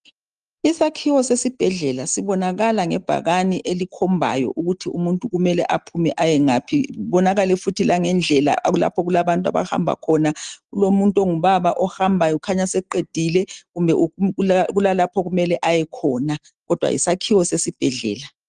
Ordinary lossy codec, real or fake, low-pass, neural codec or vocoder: Opus, 24 kbps; real; 9.9 kHz; none